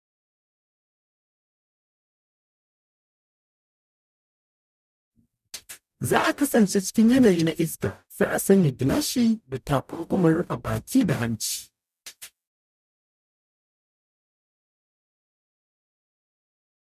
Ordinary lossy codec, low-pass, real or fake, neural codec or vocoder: none; 14.4 kHz; fake; codec, 44.1 kHz, 0.9 kbps, DAC